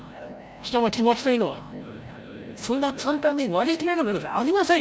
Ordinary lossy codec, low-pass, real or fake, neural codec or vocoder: none; none; fake; codec, 16 kHz, 0.5 kbps, FreqCodec, larger model